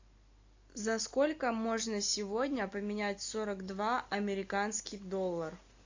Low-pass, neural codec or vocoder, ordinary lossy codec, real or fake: 7.2 kHz; none; AAC, 48 kbps; real